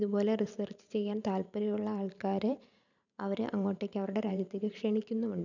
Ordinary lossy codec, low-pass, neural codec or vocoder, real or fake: none; 7.2 kHz; vocoder, 44.1 kHz, 128 mel bands every 512 samples, BigVGAN v2; fake